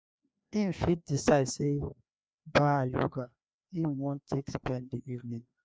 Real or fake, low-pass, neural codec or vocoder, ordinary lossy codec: fake; none; codec, 16 kHz, 2 kbps, FreqCodec, larger model; none